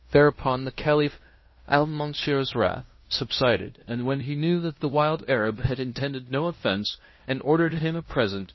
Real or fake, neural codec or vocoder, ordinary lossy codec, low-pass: fake; codec, 16 kHz in and 24 kHz out, 0.9 kbps, LongCat-Audio-Codec, fine tuned four codebook decoder; MP3, 24 kbps; 7.2 kHz